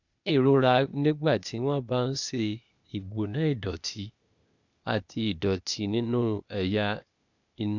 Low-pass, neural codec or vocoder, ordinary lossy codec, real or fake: 7.2 kHz; codec, 16 kHz, 0.8 kbps, ZipCodec; none; fake